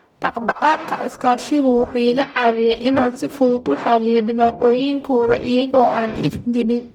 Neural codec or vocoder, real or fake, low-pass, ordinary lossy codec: codec, 44.1 kHz, 0.9 kbps, DAC; fake; 19.8 kHz; none